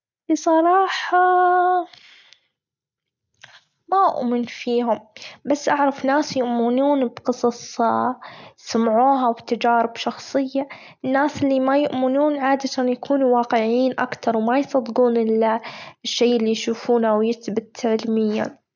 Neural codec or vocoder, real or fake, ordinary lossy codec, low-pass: none; real; none; 7.2 kHz